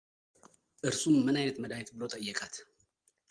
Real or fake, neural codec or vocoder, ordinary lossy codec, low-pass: real; none; Opus, 24 kbps; 9.9 kHz